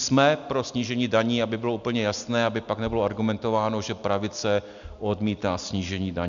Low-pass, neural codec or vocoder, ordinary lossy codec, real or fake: 7.2 kHz; none; MP3, 96 kbps; real